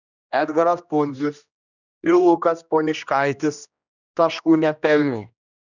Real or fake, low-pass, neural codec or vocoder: fake; 7.2 kHz; codec, 16 kHz, 1 kbps, X-Codec, HuBERT features, trained on general audio